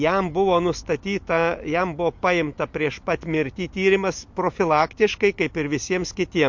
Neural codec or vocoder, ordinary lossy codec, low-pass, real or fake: none; MP3, 48 kbps; 7.2 kHz; real